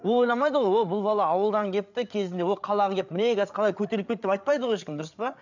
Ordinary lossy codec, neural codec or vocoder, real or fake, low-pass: none; codec, 16 kHz, 8 kbps, FreqCodec, larger model; fake; 7.2 kHz